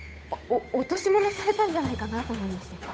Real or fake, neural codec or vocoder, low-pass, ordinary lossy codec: fake; codec, 16 kHz, 8 kbps, FunCodec, trained on Chinese and English, 25 frames a second; none; none